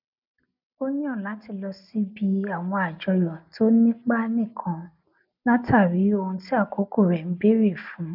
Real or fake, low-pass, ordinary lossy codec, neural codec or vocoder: real; 5.4 kHz; none; none